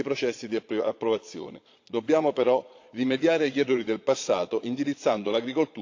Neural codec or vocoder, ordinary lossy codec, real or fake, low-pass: vocoder, 22.05 kHz, 80 mel bands, Vocos; AAC, 48 kbps; fake; 7.2 kHz